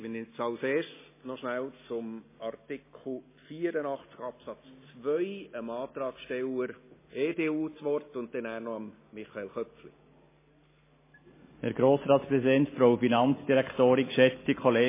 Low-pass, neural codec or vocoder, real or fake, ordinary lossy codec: 3.6 kHz; none; real; MP3, 16 kbps